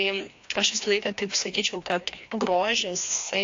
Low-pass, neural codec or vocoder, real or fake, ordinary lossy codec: 7.2 kHz; codec, 16 kHz, 1 kbps, FreqCodec, larger model; fake; AAC, 48 kbps